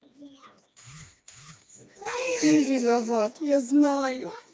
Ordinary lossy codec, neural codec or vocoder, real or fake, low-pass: none; codec, 16 kHz, 2 kbps, FreqCodec, smaller model; fake; none